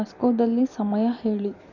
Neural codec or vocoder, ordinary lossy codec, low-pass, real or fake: vocoder, 44.1 kHz, 128 mel bands every 256 samples, BigVGAN v2; none; 7.2 kHz; fake